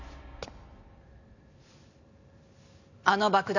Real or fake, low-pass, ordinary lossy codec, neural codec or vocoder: real; 7.2 kHz; none; none